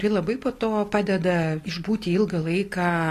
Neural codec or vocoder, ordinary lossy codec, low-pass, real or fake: none; AAC, 48 kbps; 14.4 kHz; real